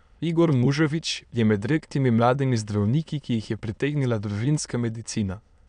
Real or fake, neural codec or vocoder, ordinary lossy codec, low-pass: fake; autoencoder, 22.05 kHz, a latent of 192 numbers a frame, VITS, trained on many speakers; none; 9.9 kHz